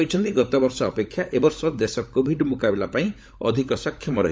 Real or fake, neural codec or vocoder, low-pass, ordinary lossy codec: fake; codec, 16 kHz, 16 kbps, FunCodec, trained on LibriTTS, 50 frames a second; none; none